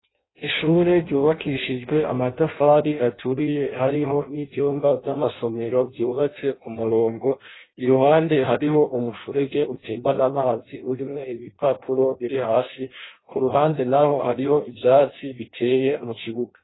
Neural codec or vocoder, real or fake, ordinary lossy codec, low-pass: codec, 16 kHz in and 24 kHz out, 0.6 kbps, FireRedTTS-2 codec; fake; AAC, 16 kbps; 7.2 kHz